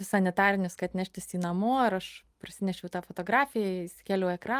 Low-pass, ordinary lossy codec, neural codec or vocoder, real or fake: 14.4 kHz; Opus, 32 kbps; none; real